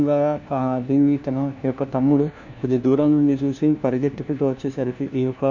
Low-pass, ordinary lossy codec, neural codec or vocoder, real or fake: 7.2 kHz; none; codec, 16 kHz, 1 kbps, FunCodec, trained on LibriTTS, 50 frames a second; fake